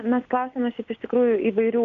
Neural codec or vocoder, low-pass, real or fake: none; 7.2 kHz; real